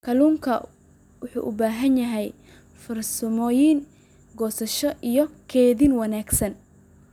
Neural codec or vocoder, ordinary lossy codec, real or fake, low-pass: none; none; real; 19.8 kHz